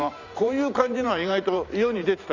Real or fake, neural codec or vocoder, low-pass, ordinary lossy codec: fake; vocoder, 44.1 kHz, 128 mel bands every 512 samples, BigVGAN v2; 7.2 kHz; none